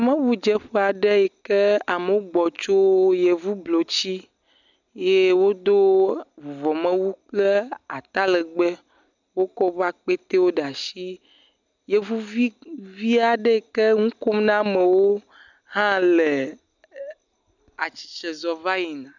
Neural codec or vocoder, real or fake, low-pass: none; real; 7.2 kHz